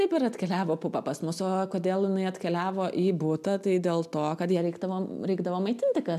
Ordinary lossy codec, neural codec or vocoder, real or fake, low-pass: MP3, 96 kbps; none; real; 14.4 kHz